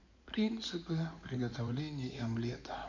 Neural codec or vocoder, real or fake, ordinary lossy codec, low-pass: codec, 16 kHz in and 24 kHz out, 2.2 kbps, FireRedTTS-2 codec; fake; none; 7.2 kHz